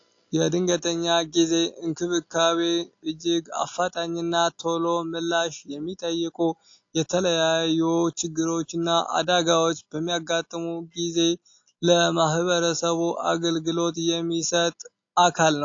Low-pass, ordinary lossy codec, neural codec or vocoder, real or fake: 7.2 kHz; AAC, 48 kbps; none; real